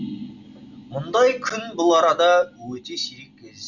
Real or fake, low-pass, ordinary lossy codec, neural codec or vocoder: real; 7.2 kHz; none; none